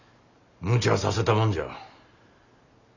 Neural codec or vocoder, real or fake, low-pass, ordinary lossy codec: none; real; 7.2 kHz; none